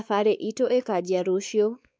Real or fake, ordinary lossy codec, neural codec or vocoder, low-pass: fake; none; codec, 16 kHz, 4 kbps, X-Codec, WavLM features, trained on Multilingual LibriSpeech; none